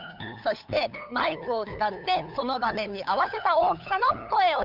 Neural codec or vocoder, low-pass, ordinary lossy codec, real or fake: codec, 16 kHz, 8 kbps, FunCodec, trained on LibriTTS, 25 frames a second; 5.4 kHz; none; fake